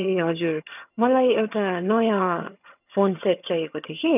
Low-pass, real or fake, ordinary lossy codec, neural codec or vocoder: 3.6 kHz; fake; none; vocoder, 22.05 kHz, 80 mel bands, HiFi-GAN